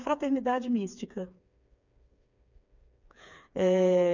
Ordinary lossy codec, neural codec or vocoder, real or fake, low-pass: none; codec, 16 kHz, 4 kbps, FreqCodec, smaller model; fake; 7.2 kHz